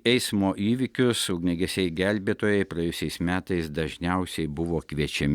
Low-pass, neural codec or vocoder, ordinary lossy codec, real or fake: 19.8 kHz; none; Opus, 64 kbps; real